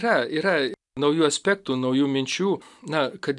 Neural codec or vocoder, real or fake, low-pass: none; real; 10.8 kHz